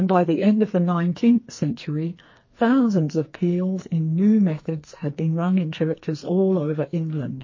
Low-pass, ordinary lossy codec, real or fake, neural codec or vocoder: 7.2 kHz; MP3, 32 kbps; fake; codec, 44.1 kHz, 2.6 kbps, SNAC